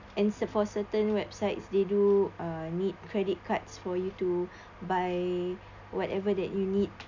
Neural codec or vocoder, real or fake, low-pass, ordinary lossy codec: none; real; 7.2 kHz; none